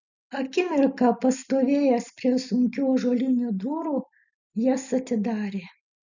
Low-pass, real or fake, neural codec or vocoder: 7.2 kHz; real; none